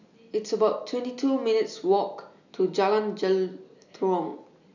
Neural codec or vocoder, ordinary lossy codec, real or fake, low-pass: none; none; real; 7.2 kHz